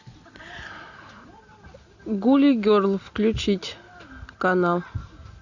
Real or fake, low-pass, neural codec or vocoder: real; 7.2 kHz; none